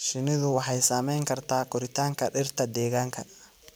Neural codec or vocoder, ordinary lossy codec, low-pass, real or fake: vocoder, 44.1 kHz, 128 mel bands every 256 samples, BigVGAN v2; none; none; fake